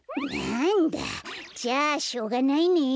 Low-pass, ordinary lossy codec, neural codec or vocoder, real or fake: none; none; none; real